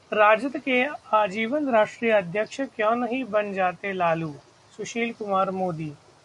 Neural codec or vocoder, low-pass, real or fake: none; 10.8 kHz; real